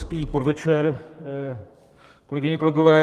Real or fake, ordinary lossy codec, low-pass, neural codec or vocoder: fake; Opus, 24 kbps; 14.4 kHz; codec, 32 kHz, 1.9 kbps, SNAC